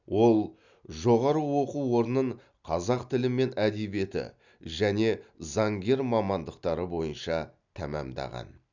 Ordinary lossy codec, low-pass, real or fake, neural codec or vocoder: none; 7.2 kHz; real; none